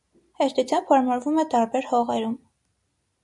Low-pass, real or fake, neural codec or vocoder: 10.8 kHz; real; none